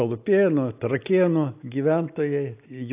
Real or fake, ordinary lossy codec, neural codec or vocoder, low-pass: real; AAC, 32 kbps; none; 3.6 kHz